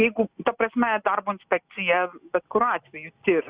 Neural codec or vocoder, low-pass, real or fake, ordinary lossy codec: none; 3.6 kHz; real; Opus, 64 kbps